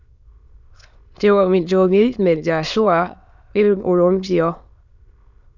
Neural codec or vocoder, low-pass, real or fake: autoencoder, 22.05 kHz, a latent of 192 numbers a frame, VITS, trained on many speakers; 7.2 kHz; fake